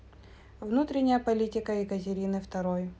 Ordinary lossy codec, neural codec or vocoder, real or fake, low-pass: none; none; real; none